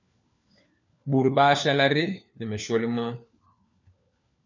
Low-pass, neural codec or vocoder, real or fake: 7.2 kHz; codec, 16 kHz, 4 kbps, FunCodec, trained on LibriTTS, 50 frames a second; fake